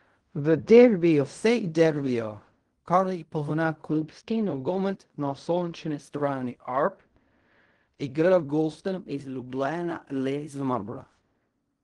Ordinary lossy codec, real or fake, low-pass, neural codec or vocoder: Opus, 24 kbps; fake; 10.8 kHz; codec, 16 kHz in and 24 kHz out, 0.4 kbps, LongCat-Audio-Codec, fine tuned four codebook decoder